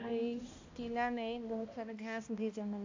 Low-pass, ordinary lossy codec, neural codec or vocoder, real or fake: 7.2 kHz; AAC, 48 kbps; codec, 16 kHz, 1 kbps, X-Codec, HuBERT features, trained on balanced general audio; fake